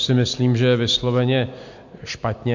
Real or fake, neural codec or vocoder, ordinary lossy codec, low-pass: real; none; MP3, 48 kbps; 7.2 kHz